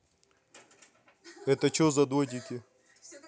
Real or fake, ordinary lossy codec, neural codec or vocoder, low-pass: real; none; none; none